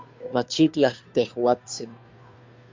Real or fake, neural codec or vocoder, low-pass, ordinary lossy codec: fake; codec, 16 kHz, 2 kbps, FunCodec, trained on Chinese and English, 25 frames a second; 7.2 kHz; MP3, 64 kbps